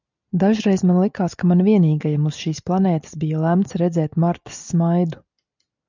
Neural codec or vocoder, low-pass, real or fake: none; 7.2 kHz; real